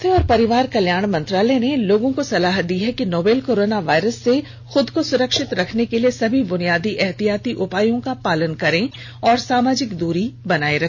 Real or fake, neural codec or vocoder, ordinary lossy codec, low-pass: real; none; none; none